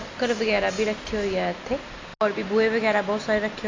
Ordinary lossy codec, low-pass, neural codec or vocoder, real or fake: AAC, 32 kbps; 7.2 kHz; none; real